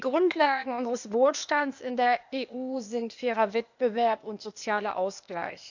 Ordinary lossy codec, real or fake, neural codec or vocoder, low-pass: none; fake; codec, 16 kHz, 0.8 kbps, ZipCodec; 7.2 kHz